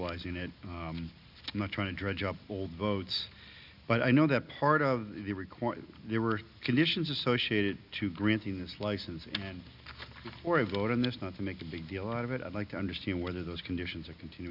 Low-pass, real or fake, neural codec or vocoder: 5.4 kHz; real; none